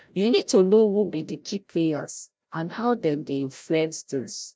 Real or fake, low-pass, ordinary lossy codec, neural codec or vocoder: fake; none; none; codec, 16 kHz, 0.5 kbps, FreqCodec, larger model